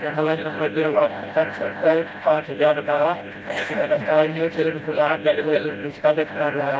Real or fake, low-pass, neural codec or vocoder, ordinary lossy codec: fake; none; codec, 16 kHz, 0.5 kbps, FreqCodec, smaller model; none